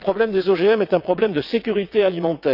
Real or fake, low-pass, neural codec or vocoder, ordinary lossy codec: fake; 5.4 kHz; vocoder, 22.05 kHz, 80 mel bands, WaveNeXt; none